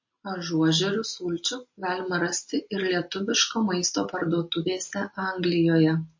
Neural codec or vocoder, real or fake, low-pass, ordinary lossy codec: none; real; 7.2 kHz; MP3, 32 kbps